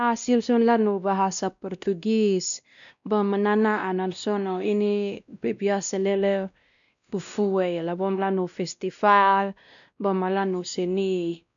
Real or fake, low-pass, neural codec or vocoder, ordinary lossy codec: fake; 7.2 kHz; codec, 16 kHz, 1 kbps, X-Codec, WavLM features, trained on Multilingual LibriSpeech; none